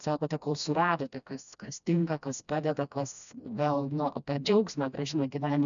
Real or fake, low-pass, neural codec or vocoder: fake; 7.2 kHz; codec, 16 kHz, 1 kbps, FreqCodec, smaller model